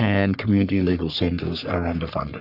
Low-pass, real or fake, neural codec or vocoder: 5.4 kHz; fake; codec, 44.1 kHz, 3.4 kbps, Pupu-Codec